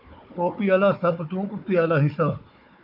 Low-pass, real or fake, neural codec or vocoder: 5.4 kHz; fake; codec, 16 kHz, 4 kbps, FunCodec, trained on Chinese and English, 50 frames a second